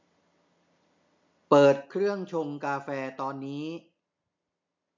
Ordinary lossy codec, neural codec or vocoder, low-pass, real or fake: MP3, 48 kbps; none; 7.2 kHz; real